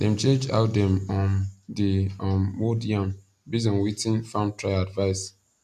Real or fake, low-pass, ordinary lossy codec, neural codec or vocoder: real; 14.4 kHz; none; none